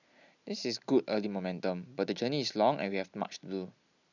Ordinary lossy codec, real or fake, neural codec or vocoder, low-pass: none; real; none; 7.2 kHz